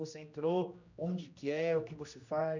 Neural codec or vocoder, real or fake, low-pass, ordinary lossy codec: codec, 16 kHz, 1 kbps, X-Codec, HuBERT features, trained on general audio; fake; 7.2 kHz; none